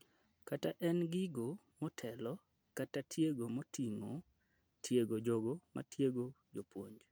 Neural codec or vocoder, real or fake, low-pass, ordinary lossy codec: none; real; none; none